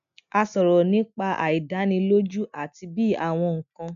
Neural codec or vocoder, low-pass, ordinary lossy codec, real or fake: none; 7.2 kHz; none; real